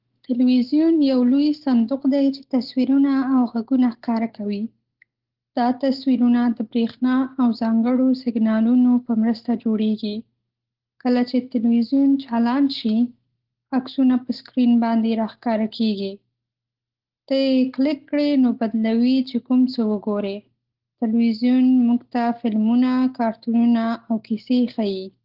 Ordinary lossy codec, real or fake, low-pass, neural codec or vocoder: Opus, 16 kbps; real; 5.4 kHz; none